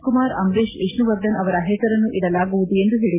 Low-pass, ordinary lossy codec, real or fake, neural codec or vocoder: 3.6 kHz; MP3, 16 kbps; real; none